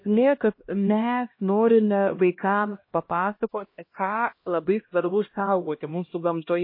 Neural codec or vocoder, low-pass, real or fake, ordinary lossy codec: codec, 16 kHz, 1 kbps, X-Codec, HuBERT features, trained on LibriSpeech; 5.4 kHz; fake; MP3, 24 kbps